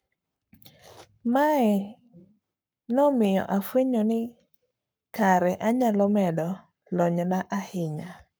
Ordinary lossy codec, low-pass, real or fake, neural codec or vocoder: none; none; fake; codec, 44.1 kHz, 7.8 kbps, Pupu-Codec